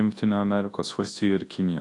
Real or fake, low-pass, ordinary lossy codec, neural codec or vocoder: fake; 10.8 kHz; AAC, 48 kbps; codec, 24 kHz, 0.9 kbps, WavTokenizer, large speech release